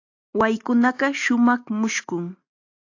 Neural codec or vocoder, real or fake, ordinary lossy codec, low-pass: none; real; AAC, 48 kbps; 7.2 kHz